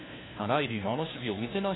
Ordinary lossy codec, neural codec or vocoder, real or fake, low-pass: AAC, 16 kbps; codec, 16 kHz, 1 kbps, FunCodec, trained on LibriTTS, 50 frames a second; fake; 7.2 kHz